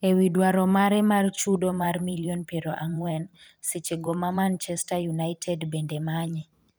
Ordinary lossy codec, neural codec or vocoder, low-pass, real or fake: none; vocoder, 44.1 kHz, 128 mel bands every 512 samples, BigVGAN v2; none; fake